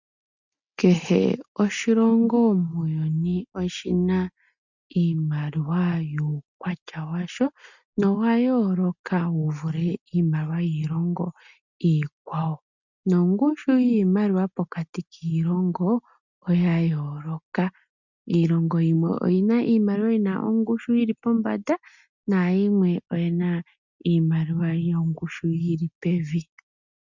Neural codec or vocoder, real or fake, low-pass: none; real; 7.2 kHz